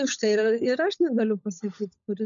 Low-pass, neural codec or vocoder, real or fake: 7.2 kHz; codec, 16 kHz, 16 kbps, FunCodec, trained on LibriTTS, 50 frames a second; fake